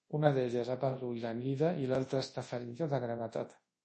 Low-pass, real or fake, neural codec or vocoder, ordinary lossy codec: 10.8 kHz; fake; codec, 24 kHz, 0.9 kbps, WavTokenizer, large speech release; MP3, 32 kbps